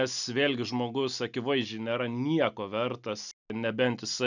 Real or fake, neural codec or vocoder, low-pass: real; none; 7.2 kHz